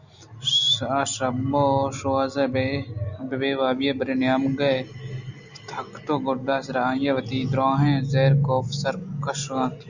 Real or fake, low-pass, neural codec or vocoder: real; 7.2 kHz; none